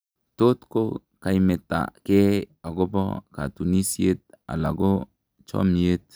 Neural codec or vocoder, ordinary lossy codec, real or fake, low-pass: none; none; real; none